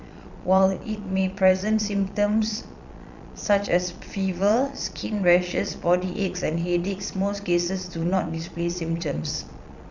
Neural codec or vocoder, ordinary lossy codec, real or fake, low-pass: vocoder, 22.05 kHz, 80 mel bands, Vocos; none; fake; 7.2 kHz